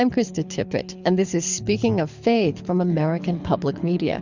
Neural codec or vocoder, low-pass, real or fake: codec, 24 kHz, 6 kbps, HILCodec; 7.2 kHz; fake